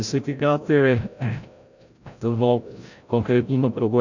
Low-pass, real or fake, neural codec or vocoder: 7.2 kHz; fake; codec, 16 kHz, 0.5 kbps, FreqCodec, larger model